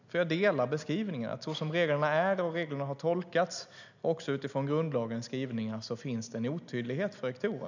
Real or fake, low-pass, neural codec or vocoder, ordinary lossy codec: real; 7.2 kHz; none; none